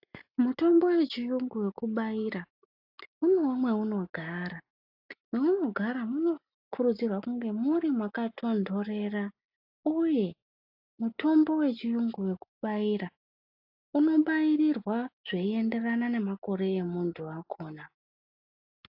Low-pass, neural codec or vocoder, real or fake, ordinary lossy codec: 5.4 kHz; none; real; AAC, 48 kbps